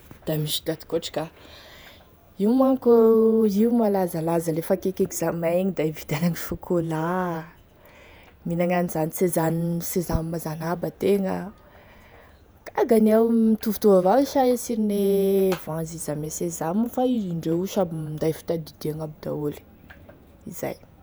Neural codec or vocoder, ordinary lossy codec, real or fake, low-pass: vocoder, 48 kHz, 128 mel bands, Vocos; none; fake; none